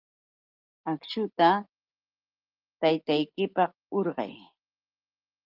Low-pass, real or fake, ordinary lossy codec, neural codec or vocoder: 5.4 kHz; real; Opus, 32 kbps; none